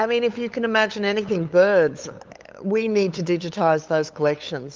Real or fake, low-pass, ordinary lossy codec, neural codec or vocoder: fake; 7.2 kHz; Opus, 24 kbps; codec, 16 kHz, 8 kbps, FunCodec, trained on LibriTTS, 25 frames a second